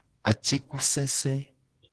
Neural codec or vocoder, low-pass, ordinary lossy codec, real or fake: codec, 24 kHz, 0.9 kbps, WavTokenizer, medium music audio release; 10.8 kHz; Opus, 16 kbps; fake